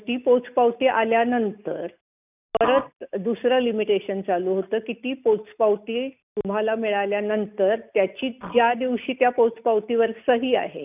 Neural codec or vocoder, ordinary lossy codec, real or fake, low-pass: none; none; real; 3.6 kHz